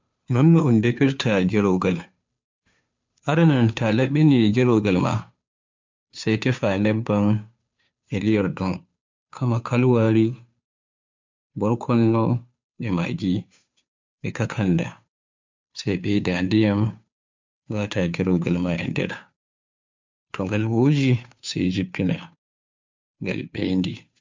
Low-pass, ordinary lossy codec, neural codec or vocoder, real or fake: 7.2 kHz; MP3, 64 kbps; codec, 16 kHz, 2 kbps, FunCodec, trained on Chinese and English, 25 frames a second; fake